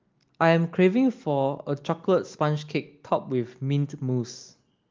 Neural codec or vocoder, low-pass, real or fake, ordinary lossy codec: none; 7.2 kHz; real; Opus, 32 kbps